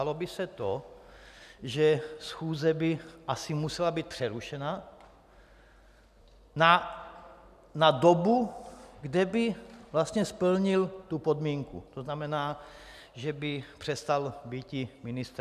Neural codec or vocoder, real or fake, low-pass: none; real; 14.4 kHz